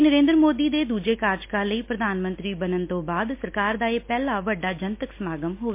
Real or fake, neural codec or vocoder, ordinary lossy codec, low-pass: real; none; MP3, 24 kbps; 3.6 kHz